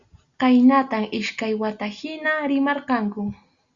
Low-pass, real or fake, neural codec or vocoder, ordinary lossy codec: 7.2 kHz; real; none; Opus, 64 kbps